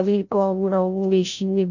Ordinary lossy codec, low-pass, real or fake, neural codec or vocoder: none; 7.2 kHz; fake; codec, 16 kHz, 0.5 kbps, FreqCodec, larger model